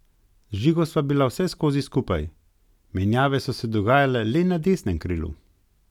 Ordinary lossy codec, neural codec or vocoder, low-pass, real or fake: none; none; 19.8 kHz; real